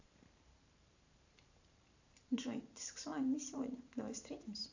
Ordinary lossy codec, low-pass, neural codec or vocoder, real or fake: AAC, 48 kbps; 7.2 kHz; vocoder, 44.1 kHz, 128 mel bands every 512 samples, BigVGAN v2; fake